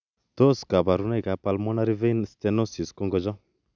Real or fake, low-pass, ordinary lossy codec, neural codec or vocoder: real; 7.2 kHz; none; none